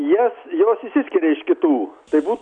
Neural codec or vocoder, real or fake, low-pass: none; real; 10.8 kHz